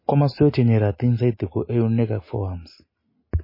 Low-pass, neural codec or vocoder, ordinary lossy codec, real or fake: 5.4 kHz; none; MP3, 24 kbps; real